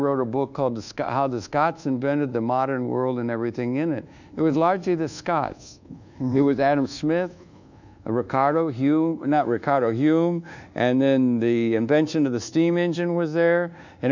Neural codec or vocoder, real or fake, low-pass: codec, 24 kHz, 1.2 kbps, DualCodec; fake; 7.2 kHz